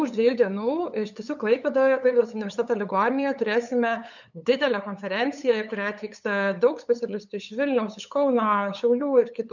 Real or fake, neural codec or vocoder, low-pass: fake; codec, 16 kHz, 8 kbps, FunCodec, trained on LibriTTS, 25 frames a second; 7.2 kHz